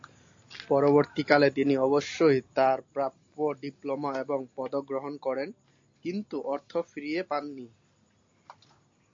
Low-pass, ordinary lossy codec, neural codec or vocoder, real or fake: 7.2 kHz; AAC, 48 kbps; none; real